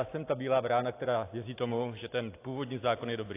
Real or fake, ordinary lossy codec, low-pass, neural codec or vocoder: real; AAC, 24 kbps; 3.6 kHz; none